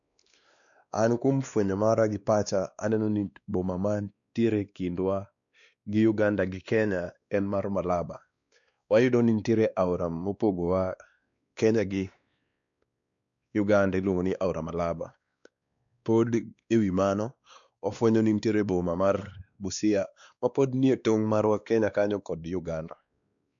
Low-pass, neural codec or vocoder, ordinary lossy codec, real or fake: 7.2 kHz; codec, 16 kHz, 2 kbps, X-Codec, WavLM features, trained on Multilingual LibriSpeech; none; fake